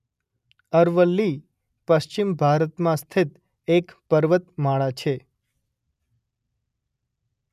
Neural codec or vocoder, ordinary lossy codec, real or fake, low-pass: none; none; real; 14.4 kHz